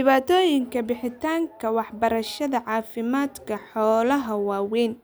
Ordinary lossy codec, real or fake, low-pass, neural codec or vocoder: none; real; none; none